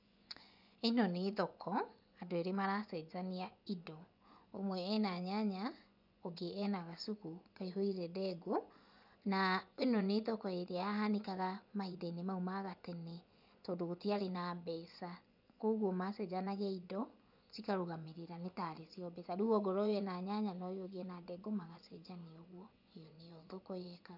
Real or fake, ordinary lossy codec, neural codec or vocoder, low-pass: fake; none; vocoder, 24 kHz, 100 mel bands, Vocos; 5.4 kHz